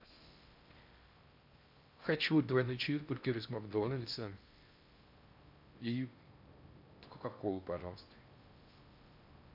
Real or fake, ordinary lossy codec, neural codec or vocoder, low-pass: fake; MP3, 48 kbps; codec, 16 kHz in and 24 kHz out, 0.6 kbps, FocalCodec, streaming, 4096 codes; 5.4 kHz